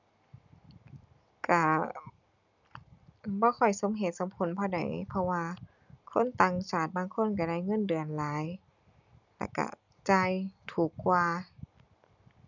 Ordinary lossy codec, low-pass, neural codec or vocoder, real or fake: none; 7.2 kHz; none; real